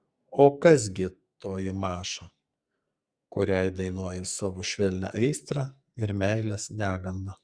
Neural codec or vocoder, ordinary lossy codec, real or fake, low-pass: codec, 44.1 kHz, 2.6 kbps, SNAC; Opus, 64 kbps; fake; 9.9 kHz